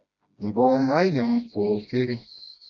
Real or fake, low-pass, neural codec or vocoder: fake; 7.2 kHz; codec, 16 kHz, 1 kbps, FreqCodec, smaller model